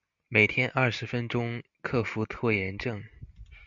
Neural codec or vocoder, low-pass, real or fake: none; 7.2 kHz; real